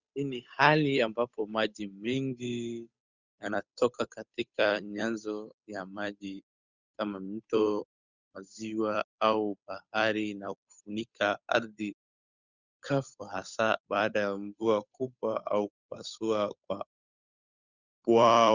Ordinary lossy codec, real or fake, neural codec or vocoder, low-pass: Opus, 64 kbps; fake; codec, 16 kHz, 8 kbps, FunCodec, trained on Chinese and English, 25 frames a second; 7.2 kHz